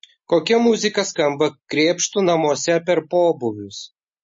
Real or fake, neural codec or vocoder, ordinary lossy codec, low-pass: real; none; MP3, 32 kbps; 10.8 kHz